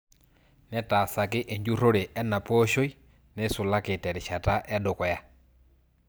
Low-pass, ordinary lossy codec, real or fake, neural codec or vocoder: none; none; real; none